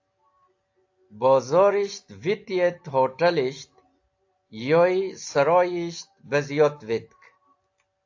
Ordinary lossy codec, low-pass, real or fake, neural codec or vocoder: AAC, 48 kbps; 7.2 kHz; real; none